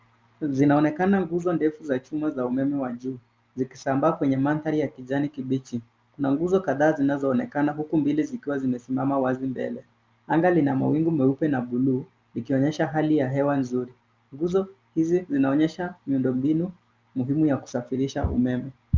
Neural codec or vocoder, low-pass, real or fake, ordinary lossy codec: none; 7.2 kHz; real; Opus, 24 kbps